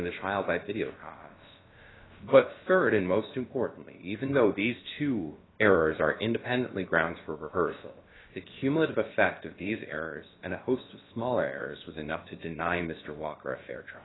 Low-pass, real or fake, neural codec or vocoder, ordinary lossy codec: 7.2 kHz; fake; codec, 16 kHz, about 1 kbps, DyCAST, with the encoder's durations; AAC, 16 kbps